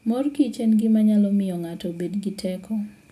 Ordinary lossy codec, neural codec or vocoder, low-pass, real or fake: none; none; 14.4 kHz; real